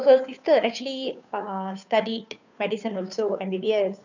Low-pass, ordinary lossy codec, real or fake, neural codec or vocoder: 7.2 kHz; none; fake; codec, 16 kHz, 2 kbps, FunCodec, trained on Chinese and English, 25 frames a second